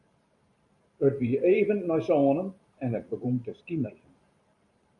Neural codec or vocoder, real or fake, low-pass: vocoder, 44.1 kHz, 128 mel bands every 512 samples, BigVGAN v2; fake; 10.8 kHz